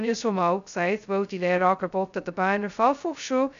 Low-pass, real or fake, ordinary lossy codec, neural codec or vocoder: 7.2 kHz; fake; MP3, 96 kbps; codec, 16 kHz, 0.2 kbps, FocalCodec